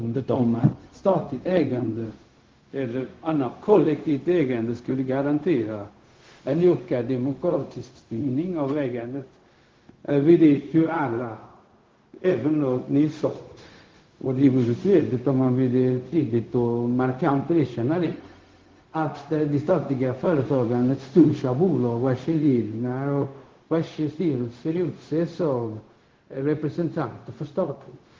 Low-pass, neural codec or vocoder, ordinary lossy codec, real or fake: 7.2 kHz; codec, 16 kHz, 0.4 kbps, LongCat-Audio-Codec; Opus, 16 kbps; fake